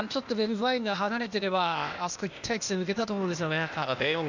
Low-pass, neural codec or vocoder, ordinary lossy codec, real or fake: 7.2 kHz; codec, 16 kHz, 0.8 kbps, ZipCodec; none; fake